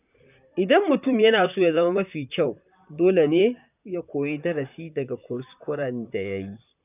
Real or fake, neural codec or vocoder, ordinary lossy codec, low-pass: fake; vocoder, 44.1 kHz, 128 mel bands, Pupu-Vocoder; none; 3.6 kHz